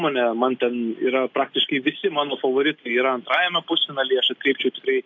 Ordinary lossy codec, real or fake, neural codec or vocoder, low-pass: AAC, 48 kbps; real; none; 7.2 kHz